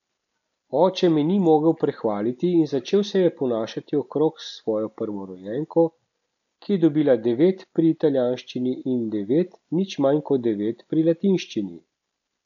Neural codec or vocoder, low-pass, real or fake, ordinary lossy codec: none; 7.2 kHz; real; none